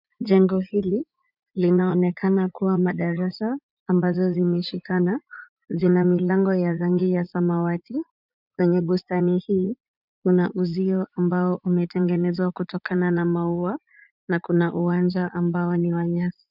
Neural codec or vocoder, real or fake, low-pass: vocoder, 44.1 kHz, 80 mel bands, Vocos; fake; 5.4 kHz